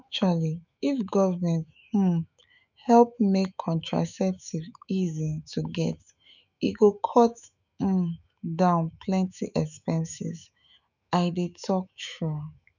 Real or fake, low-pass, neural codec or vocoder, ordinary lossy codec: fake; 7.2 kHz; autoencoder, 48 kHz, 128 numbers a frame, DAC-VAE, trained on Japanese speech; none